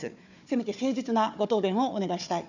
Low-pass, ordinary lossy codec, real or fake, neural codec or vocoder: 7.2 kHz; none; fake; codec, 16 kHz, 4 kbps, FunCodec, trained on Chinese and English, 50 frames a second